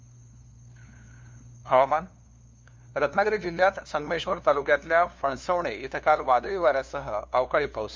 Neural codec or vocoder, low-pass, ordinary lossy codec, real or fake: codec, 16 kHz, 2 kbps, FunCodec, trained on LibriTTS, 25 frames a second; none; none; fake